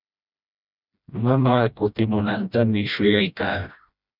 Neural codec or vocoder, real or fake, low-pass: codec, 16 kHz, 1 kbps, FreqCodec, smaller model; fake; 5.4 kHz